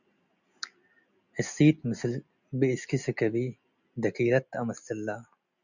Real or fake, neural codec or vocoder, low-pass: real; none; 7.2 kHz